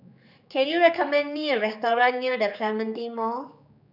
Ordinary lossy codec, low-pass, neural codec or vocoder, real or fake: none; 5.4 kHz; codec, 16 kHz, 4 kbps, X-Codec, HuBERT features, trained on general audio; fake